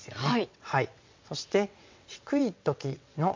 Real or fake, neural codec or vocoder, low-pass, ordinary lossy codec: fake; vocoder, 22.05 kHz, 80 mel bands, WaveNeXt; 7.2 kHz; MP3, 48 kbps